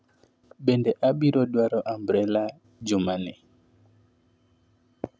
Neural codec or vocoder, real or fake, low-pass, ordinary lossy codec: none; real; none; none